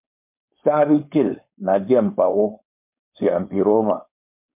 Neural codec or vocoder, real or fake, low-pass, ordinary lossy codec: codec, 16 kHz, 4.8 kbps, FACodec; fake; 3.6 kHz; MP3, 32 kbps